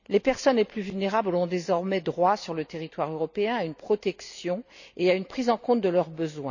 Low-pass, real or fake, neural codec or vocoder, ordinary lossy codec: 7.2 kHz; real; none; none